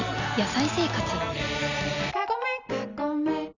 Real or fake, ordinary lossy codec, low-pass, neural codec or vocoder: real; none; 7.2 kHz; none